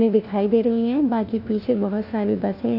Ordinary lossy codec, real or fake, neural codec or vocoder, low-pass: none; fake; codec, 16 kHz, 1 kbps, FunCodec, trained on LibriTTS, 50 frames a second; 5.4 kHz